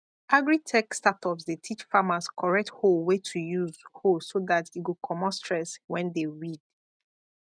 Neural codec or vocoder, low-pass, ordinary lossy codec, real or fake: none; 9.9 kHz; none; real